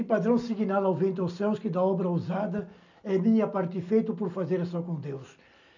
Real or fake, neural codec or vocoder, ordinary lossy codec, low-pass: real; none; none; 7.2 kHz